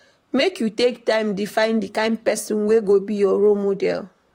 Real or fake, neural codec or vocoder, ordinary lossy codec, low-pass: fake; vocoder, 44.1 kHz, 128 mel bands, Pupu-Vocoder; AAC, 48 kbps; 19.8 kHz